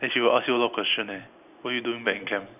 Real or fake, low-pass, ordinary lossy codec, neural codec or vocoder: real; 3.6 kHz; none; none